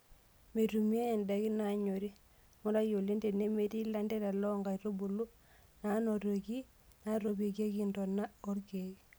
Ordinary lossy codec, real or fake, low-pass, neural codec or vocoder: none; real; none; none